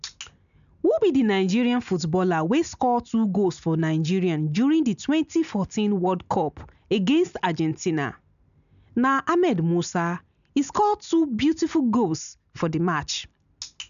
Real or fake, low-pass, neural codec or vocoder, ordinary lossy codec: real; 7.2 kHz; none; none